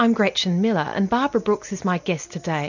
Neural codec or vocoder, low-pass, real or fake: none; 7.2 kHz; real